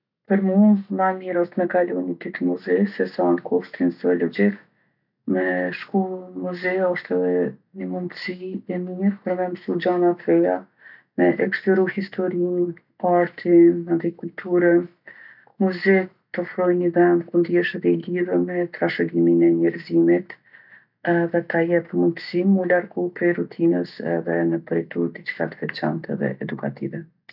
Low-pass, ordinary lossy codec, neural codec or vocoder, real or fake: 5.4 kHz; none; none; real